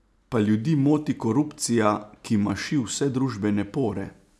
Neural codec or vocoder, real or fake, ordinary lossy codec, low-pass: none; real; none; none